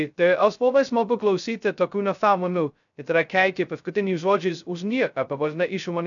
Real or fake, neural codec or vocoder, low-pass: fake; codec, 16 kHz, 0.2 kbps, FocalCodec; 7.2 kHz